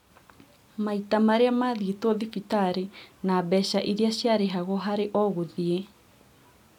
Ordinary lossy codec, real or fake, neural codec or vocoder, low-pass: none; real; none; 19.8 kHz